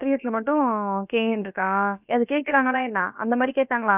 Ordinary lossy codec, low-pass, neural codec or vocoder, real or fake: none; 3.6 kHz; codec, 16 kHz, about 1 kbps, DyCAST, with the encoder's durations; fake